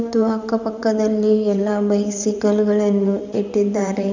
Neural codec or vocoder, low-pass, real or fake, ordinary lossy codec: vocoder, 44.1 kHz, 128 mel bands, Pupu-Vocoder; 7.2 kHz; fake; none